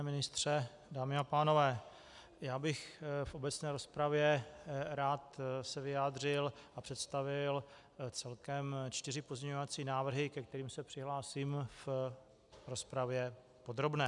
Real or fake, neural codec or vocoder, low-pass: real; none; 10.8 kHz